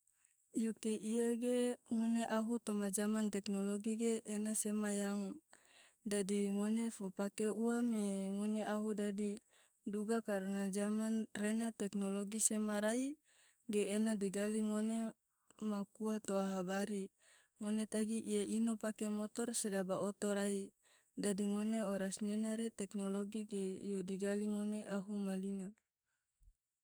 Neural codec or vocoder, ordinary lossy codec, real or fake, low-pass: codec, 44.1 kHz, 2.6 kbps, SNAC; none; fake; none